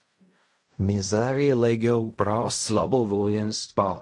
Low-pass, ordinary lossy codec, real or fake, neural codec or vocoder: 10.8 kHz; MP3, 48 kbps; fake; codec, 16 kHz in and 24 kHz out, 0.4 kbps, LongCat-Audio-Codec, fine tuned four codebook decoder